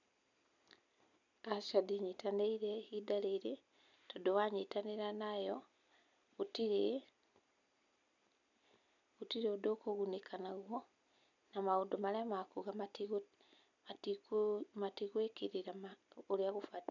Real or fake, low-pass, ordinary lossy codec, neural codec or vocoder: real; 7.2 kHz; none; none